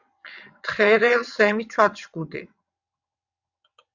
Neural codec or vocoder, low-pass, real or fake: vocoder, 22.05 kHz, 80 mel bands, WaveNeXt; 7.2 kHz; fake